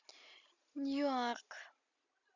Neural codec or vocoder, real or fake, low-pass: none; real; 7.2 kHz